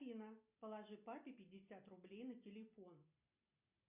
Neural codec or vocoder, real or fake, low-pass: none; real; 3.6 kHz